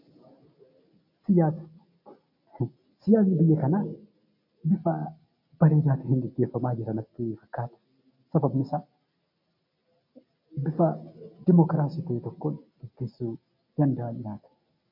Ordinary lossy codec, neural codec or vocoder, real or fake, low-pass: MP3, 32 kbps; none; real; 5.4 kHz